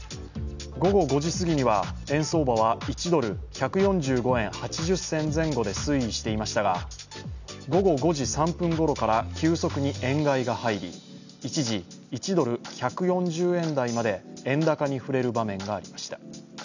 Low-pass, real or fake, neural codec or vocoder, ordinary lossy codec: 7.2 kHz; real; none; none